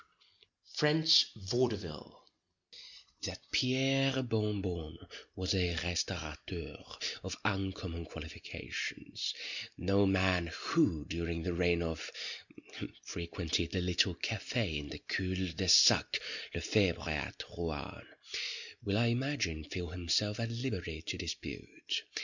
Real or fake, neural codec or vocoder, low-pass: real; none; 7.2 kHz